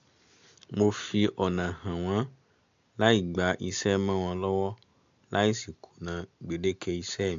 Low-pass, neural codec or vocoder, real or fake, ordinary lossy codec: 7.2 kHz; none; real; AAC, 64 kbps